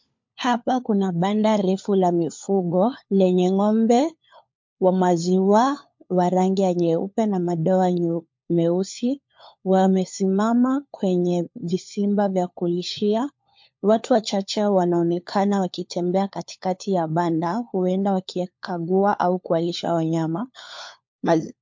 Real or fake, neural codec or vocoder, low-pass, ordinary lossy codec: fake; codec, 16 kHz, 4 kbps, FunCodec, trained on LibriTTS, 50 frames a second; 7.2 kHz; MP3, 48 kbps